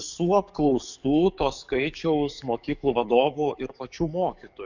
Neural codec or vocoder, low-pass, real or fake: codec, 44.1 kHz, 7.8 kbps, DAC; 7.2 kHz; fake